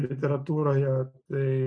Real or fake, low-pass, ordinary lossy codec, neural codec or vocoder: real; 9.9 kHz; AAC, 64 kbps; none